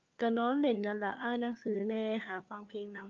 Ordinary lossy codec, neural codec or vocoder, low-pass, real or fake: Opus, 24 kbps; codec, 16 kHz, 4 kbps, FunCodec, trained on LibriTTS, 50 frames a second; 7.2 kHz; fake